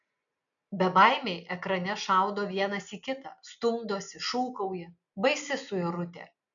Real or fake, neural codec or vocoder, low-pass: real; none; 7.2 kHz